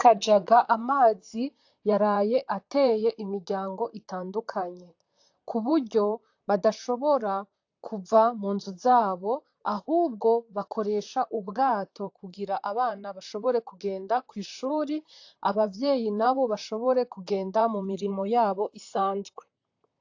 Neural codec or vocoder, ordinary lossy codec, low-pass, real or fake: vocoder, 44.1 kHz, 128 mel bands, Pupu-Vocoder; AAC, 48 kbps; 7.2 kHz; fake